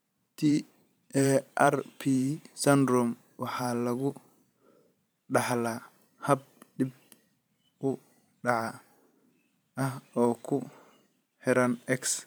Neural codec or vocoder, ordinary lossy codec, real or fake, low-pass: vocoder, 44.1 kHz, 128 mel bands every 512 samples, BigVGAN v2; none; fake; none